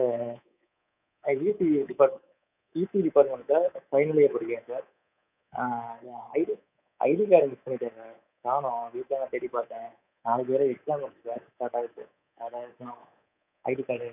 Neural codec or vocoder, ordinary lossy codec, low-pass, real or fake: codec, 24 kHz, 3.1 kbps, DualCodec; none; 3.6 kHz; fake